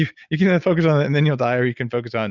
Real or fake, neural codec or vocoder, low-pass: real; none; 7.2 kHz